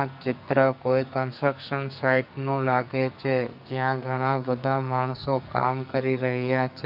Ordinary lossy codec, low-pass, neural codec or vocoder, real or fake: AAC, 48 kbps; 5.4 kHz; codec, 44.1 kHz, 2.6 kbps, SNAC; fake